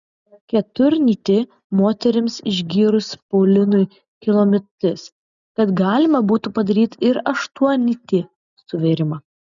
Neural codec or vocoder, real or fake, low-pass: none; real; 7.2 kHz